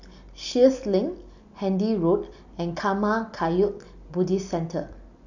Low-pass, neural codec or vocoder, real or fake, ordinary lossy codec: 7.2 kHz; none; real; none